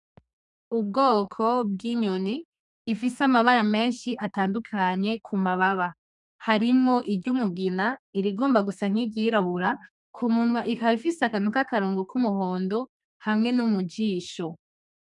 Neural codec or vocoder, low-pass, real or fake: codec, 32 kHz, 1.9 kbps, SNAC; 10.8 kHz; fake